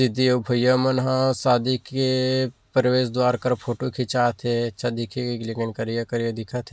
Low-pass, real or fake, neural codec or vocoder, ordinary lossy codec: none; real; none; none